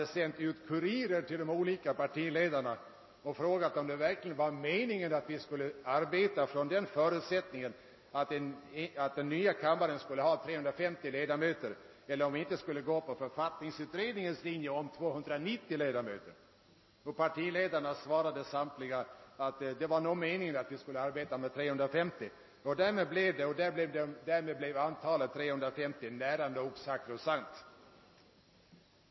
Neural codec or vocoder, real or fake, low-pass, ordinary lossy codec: none; real; 7.2 kHz; MP3, 24 kbps